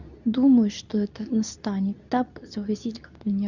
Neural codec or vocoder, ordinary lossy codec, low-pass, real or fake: codec, 24 kHz, 0.9 kbps, WavTokenizer, medium speech release version 2; none; 7.2 kHz; fake